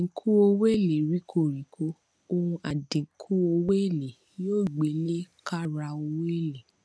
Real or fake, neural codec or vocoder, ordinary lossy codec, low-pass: real; none; none; none